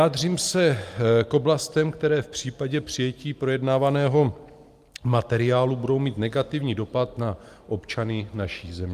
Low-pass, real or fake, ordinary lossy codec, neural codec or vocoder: 14.4 kHz; real; Opus, 32 kbps; none